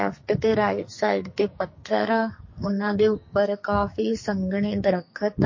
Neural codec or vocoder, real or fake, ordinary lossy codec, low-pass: codec, 44.1 kHz, 2.6 kbps, SNAC; fake; MP3, 32 kbps; 7.2 kHz